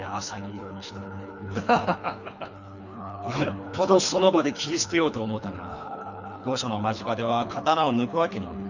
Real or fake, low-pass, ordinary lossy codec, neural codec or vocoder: fake; 7.2 kHz; none; codec, 24 kHz, 3 kbps, HILCodec